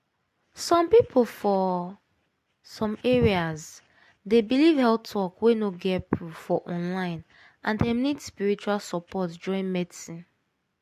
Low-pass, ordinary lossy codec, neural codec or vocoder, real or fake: 14.4 kHz; MP3, 64 kbps; none; real